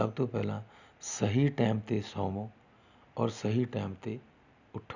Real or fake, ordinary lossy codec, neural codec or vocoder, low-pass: real; none; none; 7.2 kHz